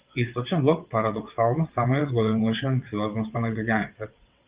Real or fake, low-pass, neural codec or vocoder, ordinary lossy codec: fake; 3.6 kHz; vocoder, 22.05 kHz, 80 mel bands, WaveNeXt; Opus, 32 kbps